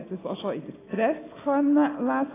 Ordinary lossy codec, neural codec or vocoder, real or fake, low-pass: AAC, 16 kbps; none; real; 3.6 kHz